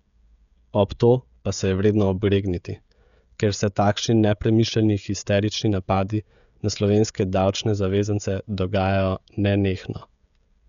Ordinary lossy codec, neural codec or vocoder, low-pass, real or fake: none; codec, 16 kHz, 16 kbps, FreqCodec, smaller model; 7.2 kHz; fake